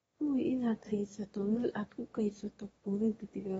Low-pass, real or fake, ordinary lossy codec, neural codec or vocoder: 9.9 kHz; fake; AAC, 24 kbps; autoencoder, 22.05 kHz, a latent of 192 numbers a frame, VITS, trained on one speaker